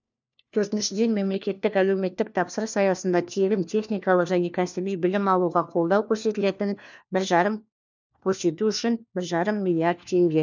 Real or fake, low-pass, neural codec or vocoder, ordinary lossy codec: fake; 7.2 kHz; codec, 16 kHz, 1 kbps, FunCodec, trained on LibriTTS, 50 frames a second; none